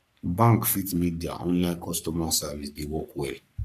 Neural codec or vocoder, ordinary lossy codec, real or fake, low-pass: codec, 44.1 kHz, 3.4 kbps, Pupu-Codec; none; fake; 14.4 kHz